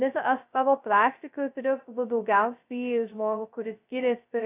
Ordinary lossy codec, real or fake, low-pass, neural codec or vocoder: AAC, 24 kbps; fake; 3.6 kHz; codec, 16 kHz, 0.2 kbps, FocalCodec